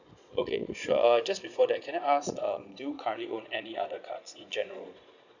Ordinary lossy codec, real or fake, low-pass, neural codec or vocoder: none; fake; 7.2 kHz; vocoder, 22.05 kHz, 80 mel bands, Vocos